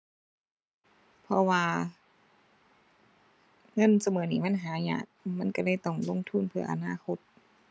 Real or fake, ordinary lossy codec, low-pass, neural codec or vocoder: real; none; none; none